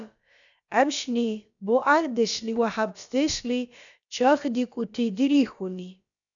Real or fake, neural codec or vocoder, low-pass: fake; codec, 16 kHz, about 1 kbps, DyCAST, with the encoder's durations; 7.2 kHz